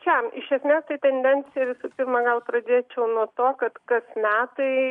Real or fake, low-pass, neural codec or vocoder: real; 10.8 kHz; none